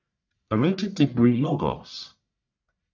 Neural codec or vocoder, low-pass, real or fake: codec, 44.1 kHz, 1.7 kbps, Pupu-Codec; 7.2 kHz; fake